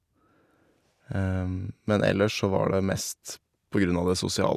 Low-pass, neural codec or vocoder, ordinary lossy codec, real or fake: 14.4 kHz; none; none; real